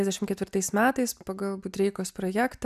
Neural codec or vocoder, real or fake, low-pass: none; real; 14.4 kHz